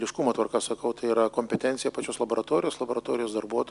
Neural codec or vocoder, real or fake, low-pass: none; real; 10.8 kHz